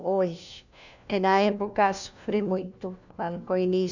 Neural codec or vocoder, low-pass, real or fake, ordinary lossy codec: codec, 16 kHz, 1 kbps, FunCodec, trained on LibriTTS, 50 frames a second; 7.2 kHz; fake; none